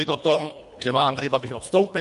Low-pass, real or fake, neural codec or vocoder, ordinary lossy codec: 10.8 kHz; fake; codec, 24 kHz, 1.5 kbps, HILCodec; MP3, 64 kbps